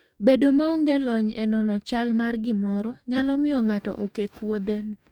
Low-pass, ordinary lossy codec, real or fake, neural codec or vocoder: 19.8 kHz; none; fake; codec, 44.1 kHz, 2.6 kbps, DAC